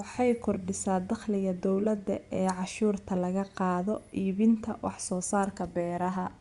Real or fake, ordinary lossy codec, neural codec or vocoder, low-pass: fake; Opus, 64 kbps; vocoder, 24 kHz, 100 mel bands, Vocos; 10.8 kHz